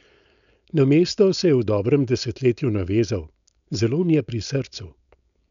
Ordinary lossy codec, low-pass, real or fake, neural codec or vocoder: none; 7.2 kHz; fake; codec, 16 kHz, 4.8 kbps, FACodec